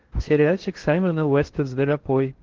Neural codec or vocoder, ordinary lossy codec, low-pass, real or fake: codec, 16 kHz in and 24 kHz out, 0.6 kbps, FocalCodec, streaming, 4096 codes; Opus, 16 kbps; 7.2 kHz; fake